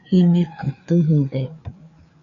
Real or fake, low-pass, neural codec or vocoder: fake; 7.2 kHz; codec, 16 kHz, 4 kbps, FreqCodec, larger model